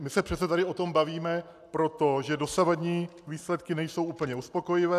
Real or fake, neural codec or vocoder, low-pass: real; none; 14.4 kHz